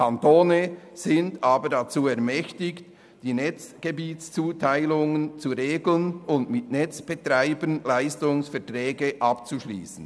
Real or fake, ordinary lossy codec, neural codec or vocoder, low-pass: real; none; none; none